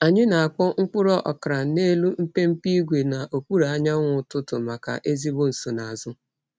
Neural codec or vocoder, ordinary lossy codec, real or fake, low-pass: none; none; real; none